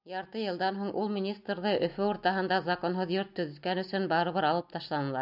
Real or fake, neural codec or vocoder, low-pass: real; none; 5.4 kHz